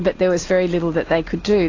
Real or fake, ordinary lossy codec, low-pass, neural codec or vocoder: real; AAC, 32 kbps; 7.2 kHz; none